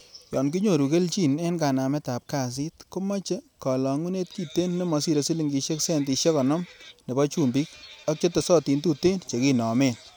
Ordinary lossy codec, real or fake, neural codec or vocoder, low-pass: none; real; none; none